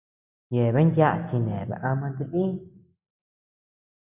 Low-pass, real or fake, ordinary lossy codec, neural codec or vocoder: 3.6 kHz; real; Opus, 64 kbps; none